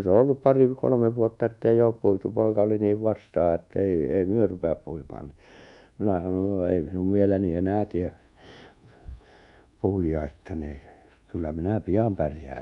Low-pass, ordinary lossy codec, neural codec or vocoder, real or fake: 10.8 kHz; MP3, 96 kbps; codec, 24 kHz, 1.2 kbps, DualCodec; fake